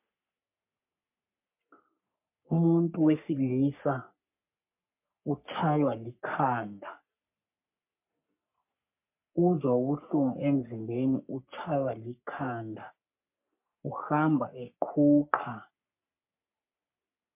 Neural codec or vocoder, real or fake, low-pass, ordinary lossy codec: codec, 44.1 kHz, 3.4 kbps, Pupu-Codec; fake; 3.6 kHz; MP3, 32 kbps